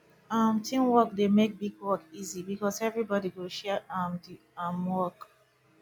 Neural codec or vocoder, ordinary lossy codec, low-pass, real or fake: none; none; 19.8 kHz; real